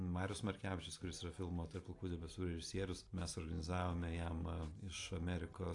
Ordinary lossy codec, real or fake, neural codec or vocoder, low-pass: AAC, 48 kbps; real; none; 10.8 kHz